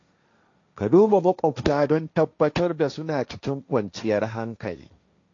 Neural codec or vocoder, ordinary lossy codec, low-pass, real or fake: codec, 16 kHz, 1.1 kbps, Voila-Tokenizer; AAC, 48 kbps; 7.2 kHz; fake